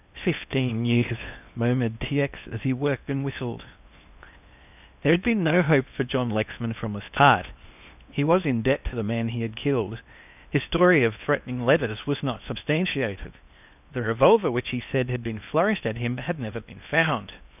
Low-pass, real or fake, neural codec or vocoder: 3.6 kHz; fake; codec, 16 kHz in and 24 kHz out, 0.8 kbps, FocalCodec, streaming, 65536 codes